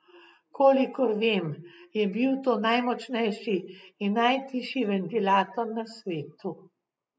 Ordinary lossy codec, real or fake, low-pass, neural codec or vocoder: none; real; none; none